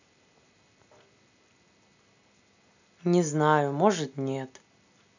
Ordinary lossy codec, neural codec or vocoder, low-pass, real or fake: none; none; 7.2 kHz; real